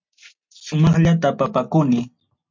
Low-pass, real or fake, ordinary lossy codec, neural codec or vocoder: 7.2 kHz; real; MP3, 48 kbps; none